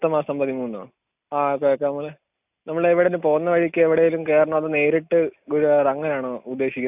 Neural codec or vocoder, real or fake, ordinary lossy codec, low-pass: none; real; none; 3.6 kHz